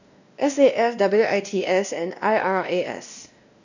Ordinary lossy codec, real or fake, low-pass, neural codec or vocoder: none; fake; 7.2 kHz; codec, 16 kHz, 1 kbps, X-Codec, WavLM features, trained on Multilingual LibriSpeech